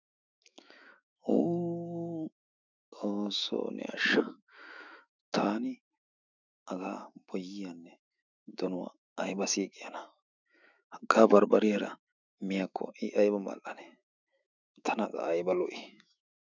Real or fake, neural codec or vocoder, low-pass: fake; autoencoder, 48 kHz, 128 numbers a frame, DAC-VAE, trained on Japanese speech; 7.2 kHz